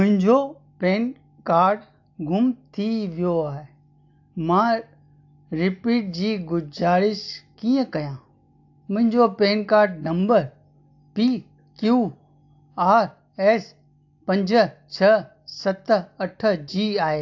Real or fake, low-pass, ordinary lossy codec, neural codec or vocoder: real; 7.2 kHz; AAC, 48 kbps; none